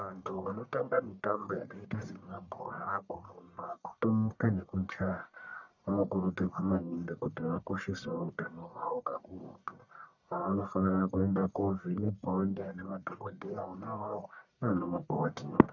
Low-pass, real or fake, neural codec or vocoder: 7.2 kHz; fake; codec, 44.1 kHz, 1.7 kbps, Pupu-Codec